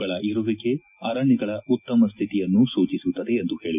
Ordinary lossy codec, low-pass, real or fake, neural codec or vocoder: none; 3.6 kHz; real; none